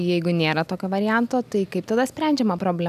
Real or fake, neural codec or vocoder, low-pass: real; none; 14.4 kHz